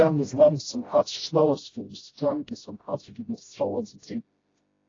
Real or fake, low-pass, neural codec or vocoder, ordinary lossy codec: fake; 7.2 kHz; codec, 16 kHz, 0.5 kbps, FreqCodec, smaller model; AAC, 32 kbps